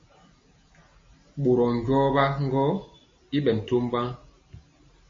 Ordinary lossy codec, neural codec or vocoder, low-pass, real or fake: MP3, 32 kbps; none; 7.2 kHz; real